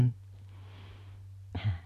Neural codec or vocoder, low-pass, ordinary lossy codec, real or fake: none; 14.4 kHz; none; real